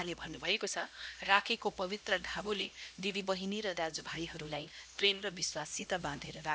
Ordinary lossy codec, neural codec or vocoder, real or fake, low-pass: none; codec, 16 kHz, 1 kbps, X-Codec, HuBERT features, trained on LibriSpeech; fake; none